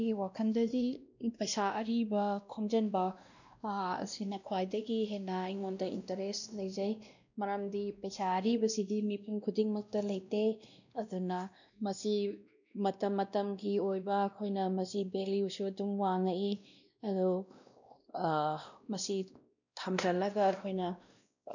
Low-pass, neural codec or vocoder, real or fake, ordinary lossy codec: 7.2 kHz; codec, 16 kHz, 1 kbps, X-Codec, WavLM features, trained on Multilingual LibriSpeech; fake; none